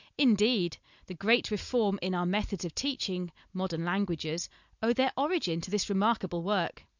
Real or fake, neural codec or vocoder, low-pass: real; none; 7.2 kHz